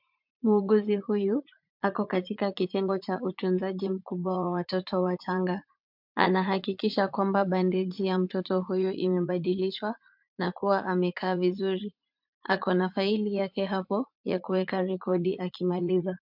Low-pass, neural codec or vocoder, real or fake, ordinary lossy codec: 5.4 kHz; vocoder, 44.1 kHz, 80 mel bands, Vocos; fake; MP3, 48 kbps